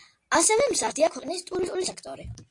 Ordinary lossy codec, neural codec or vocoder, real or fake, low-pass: AAC, 48 kbps; none; real; 10.8 kHz